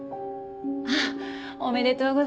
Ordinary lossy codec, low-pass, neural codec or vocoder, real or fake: none; none; none; real